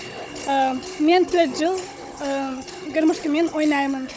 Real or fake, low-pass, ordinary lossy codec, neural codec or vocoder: fake; none; none; codec, 16 kHz, 16 kbps, FunCodec, trained on Chinese and English, 50 frames a second